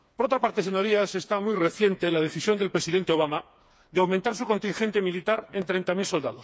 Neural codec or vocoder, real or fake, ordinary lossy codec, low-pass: codec, 16 kHz, 4 kbps, FreqCodec, smaller model; fake; none; none